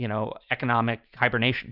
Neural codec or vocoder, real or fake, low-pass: vocoder, 44.1 kHz, 128 mel bands every 512 samples, BigVGAN v2; fake; 5.4 kHz